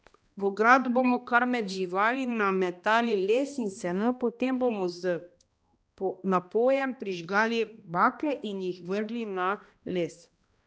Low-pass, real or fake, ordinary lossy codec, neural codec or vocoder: none; fake; none; codec, 16 kHz, 1 kbps, X-Codec, HuBERT features, trained on balanced general audio